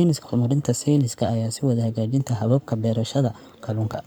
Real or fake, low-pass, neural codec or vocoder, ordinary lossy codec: fake; none; codec, 44.1 kHz, 7.8 kbps, Pupu-Codec; none